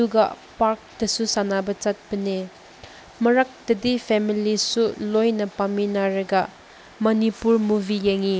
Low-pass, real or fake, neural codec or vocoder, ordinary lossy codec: none; real; none; none